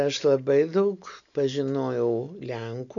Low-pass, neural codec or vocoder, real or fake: 7.2 kHz; none; real